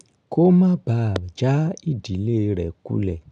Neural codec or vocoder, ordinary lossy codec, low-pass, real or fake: none; none; 9.9 kHz; real